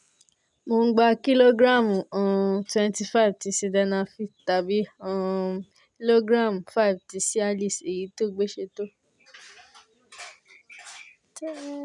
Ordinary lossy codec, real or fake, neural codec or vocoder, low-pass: none; real; none; 10.8 kHz